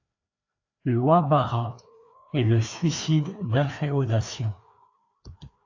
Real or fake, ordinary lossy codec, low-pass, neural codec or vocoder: fake; AAC, 48 kbps; 7.2 kHz; codec, 16 kHz, 2 kbps, FreqCodec, larger model